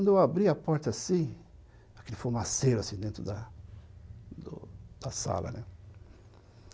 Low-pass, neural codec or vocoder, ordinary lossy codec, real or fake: none; none; none; real